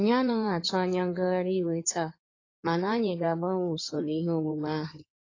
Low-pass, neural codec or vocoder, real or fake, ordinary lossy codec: 7.2 kHz; codec, 16 kHz in and 24 kHz out, 2.2 kbps, FireRedTTS-2 codec; fake; AAC, 32 kbps